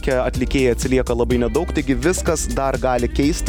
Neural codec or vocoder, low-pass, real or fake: none; 19.8 kHz; real